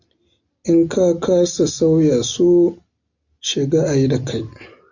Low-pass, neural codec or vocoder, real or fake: 7.2 kHz; none; real